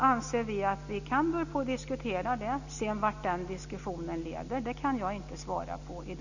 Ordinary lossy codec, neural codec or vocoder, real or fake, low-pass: none; none; real; 7.2 kHz